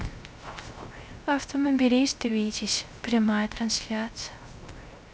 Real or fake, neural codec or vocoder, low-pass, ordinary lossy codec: fake; codec, 16 kHz, 0.3 kbps, FocalCodec; none; none